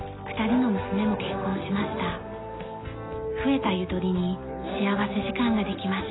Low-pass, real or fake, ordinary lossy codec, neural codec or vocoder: 7.2 kHz; real; AAC, 16 kbps; none